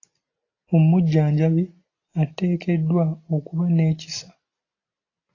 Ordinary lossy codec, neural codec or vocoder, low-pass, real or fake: AAC, 32 kbps; none; 7.2 kHz; real